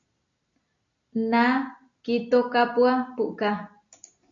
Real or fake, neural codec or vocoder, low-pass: real; none; 7.2 kHz